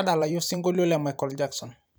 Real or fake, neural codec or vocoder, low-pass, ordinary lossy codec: fake; vocoder, 44.1 kHz, 128 mel bands every 512 samples, BigVGAN v2; none; none